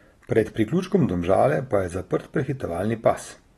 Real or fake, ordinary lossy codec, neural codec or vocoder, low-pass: real; AAC, 32 kbps; none; 19.8 kHz